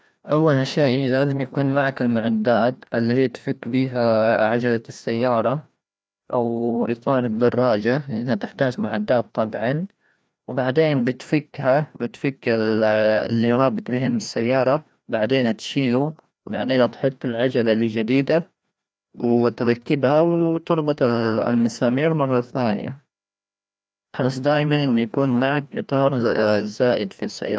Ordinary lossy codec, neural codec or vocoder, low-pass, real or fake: none; codec, 16 kHz, 1 kbps, FreqCodec, larger model; none; fake